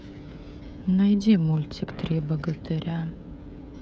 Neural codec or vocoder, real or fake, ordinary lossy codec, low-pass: codec, 16 kHz, 16 kbps, FreqCodec, smaller model; fake; none; none